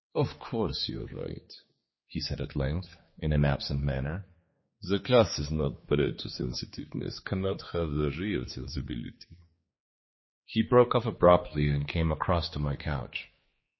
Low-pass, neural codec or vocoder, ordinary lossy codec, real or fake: 7.2 kHz; codec, 16 kHz, 4 kbps, X-Codec, HuBERT features, trained on general audio; MP3, 24 kbps; fake